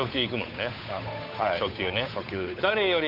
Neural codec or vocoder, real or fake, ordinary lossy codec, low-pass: codec, 16 kHz, 8 kbps, FunCodec, trained on Chinese and English, 25 frames a second; fake; none; 5.4 kHz